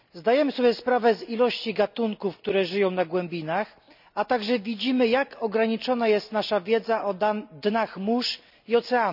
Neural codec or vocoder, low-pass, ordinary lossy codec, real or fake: none; 5.4 kHz; none; real